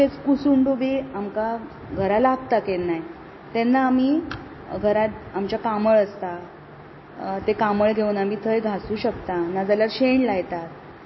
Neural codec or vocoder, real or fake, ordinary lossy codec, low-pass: none; real; MP3, 24 kbps; 7.2 kHz